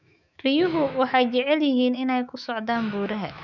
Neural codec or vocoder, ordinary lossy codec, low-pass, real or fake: autoencoder, 48 kHz, 128 numbers a frame, DAC-VAE, trained on Japanese speech; none; 7.2 kHz; fake